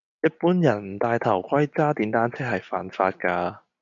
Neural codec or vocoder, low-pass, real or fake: none; 7.2 kHz; real